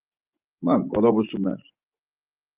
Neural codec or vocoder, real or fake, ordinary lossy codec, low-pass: vocoder, 24 kHz, 100 mel bands, Vocos; fake; Opus, 32 kbps; 3.6 kHz